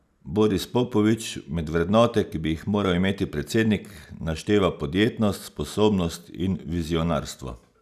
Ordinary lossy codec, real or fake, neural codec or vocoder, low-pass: none; real; none; 14.4 kHz